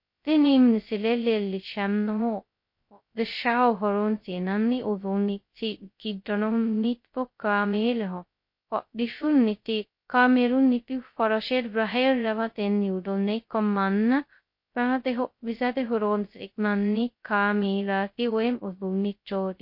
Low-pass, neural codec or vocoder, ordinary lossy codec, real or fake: 5.4 kHz; codec, 16 kHz, 0.2 kbps, FocalCodec; MP3, 32 kbps; fake